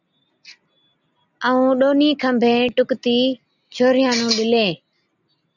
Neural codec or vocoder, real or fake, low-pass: none; real; 7.2 kHz